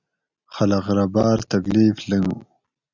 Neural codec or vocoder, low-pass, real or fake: none; 7.2 kHz; real